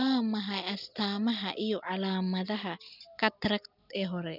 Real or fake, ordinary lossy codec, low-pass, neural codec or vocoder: real; none; 5.4 kHz; none